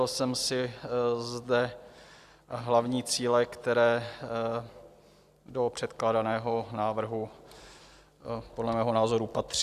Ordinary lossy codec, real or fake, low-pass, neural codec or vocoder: Opus, 64 kbps; real; 14.4 kHz; none